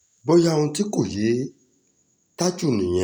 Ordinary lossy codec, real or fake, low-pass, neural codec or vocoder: none; real; none; none